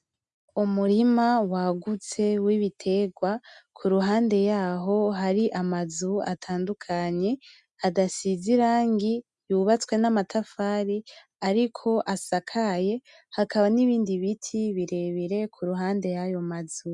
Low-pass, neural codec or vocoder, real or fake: 10.8 kHz; none; real